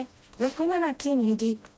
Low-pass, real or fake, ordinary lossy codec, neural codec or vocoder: none; fake; none; codec, 16 kHz, 1 kbps, FreqCodec, smaller model